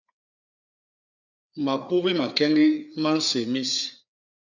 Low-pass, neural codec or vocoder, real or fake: 7.2 kHz; codec, 16 kHz, 4 kbps, FreqCodec, larger model; fake